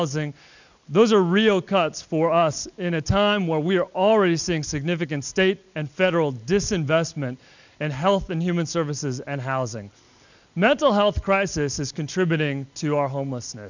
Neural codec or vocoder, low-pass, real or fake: none; 7.2 kHz; real